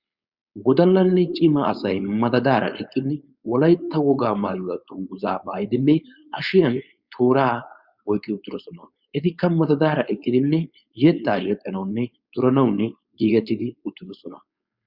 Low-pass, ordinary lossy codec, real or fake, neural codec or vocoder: 5.4 kHz; Opus, 64 kbps; fake; codec, 16 kHz, 4.8 kbps, FACodec